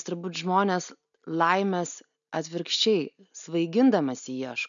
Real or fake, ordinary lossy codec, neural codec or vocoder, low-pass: real; MP3, 96 kbps; none; 7.2 kHz